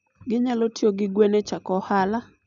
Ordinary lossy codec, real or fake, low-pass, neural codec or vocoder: none; real; 7.2 kHz; none